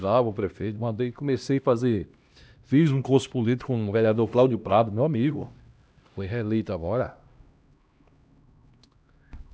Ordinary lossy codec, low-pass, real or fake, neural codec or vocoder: none; none; fake; codec, 16 kHz, 1 kbps, X-Codec, HuBERT features, trained on LibriSpeech